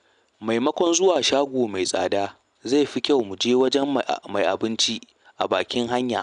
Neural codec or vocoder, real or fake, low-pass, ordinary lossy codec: none; real; 9.9 kHz; none